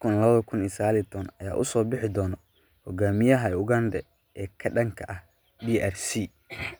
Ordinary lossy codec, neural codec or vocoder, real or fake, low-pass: none; none; real; none